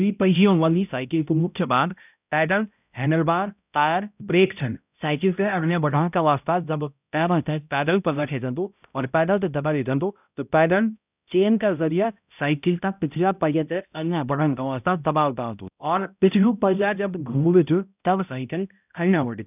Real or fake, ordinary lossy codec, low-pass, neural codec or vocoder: fake; none; 3.6 kHz; codec, 16 kHz, 0.5 kbps, X-Codec, HuBERT features, trained on balanced general audio